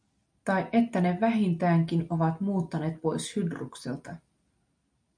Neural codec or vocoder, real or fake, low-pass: none; real; 9.9 kHz